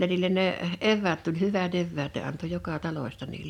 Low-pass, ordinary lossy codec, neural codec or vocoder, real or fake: 19.8 kHz; none; none; real